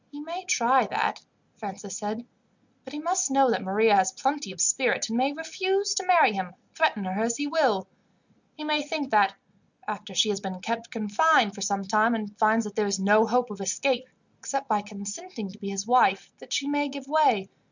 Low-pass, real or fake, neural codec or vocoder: 7.2 kHz; real; none